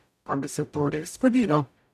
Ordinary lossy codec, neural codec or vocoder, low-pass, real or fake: none; codec, 44.1 kHz, 0.9 kbps, DAC; 14.4 kHz; fake